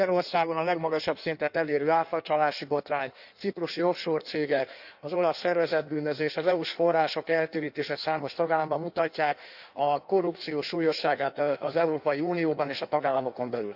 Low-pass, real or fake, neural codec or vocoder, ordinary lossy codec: 5.4 kHz; fake; codec, 16 kHz in and 24 kHz out, 1.1 kbps, FireRedTTS-2 codec; none